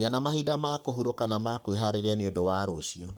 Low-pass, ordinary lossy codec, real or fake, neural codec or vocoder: none; none; fake; codec, 44.1 kHz, 3.4 kbps, Pupu-Codec